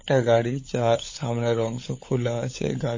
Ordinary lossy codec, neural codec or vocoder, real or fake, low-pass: MP3, 32 kbps; codec, 16 kHz, 16 kbps, FunCodec, trained on LibriTTS, 50 frames a second; fake; 7.2 kHz